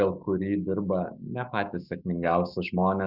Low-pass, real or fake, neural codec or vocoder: 5.4 kHz; fake; autoencoder, 48 kHz, 128 numbers a frame, DAC-VAE, trained on Japanese speech